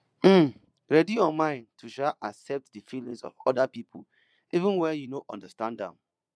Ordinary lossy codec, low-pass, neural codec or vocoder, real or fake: none; none; vocoder, 22.05 kHz, 80 mel bands, Vocos; fake